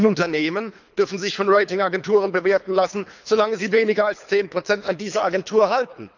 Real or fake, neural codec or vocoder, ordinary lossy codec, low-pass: fake; codec, 24 kHz, 3 kbps, HILCodec; none; 7.2 kHz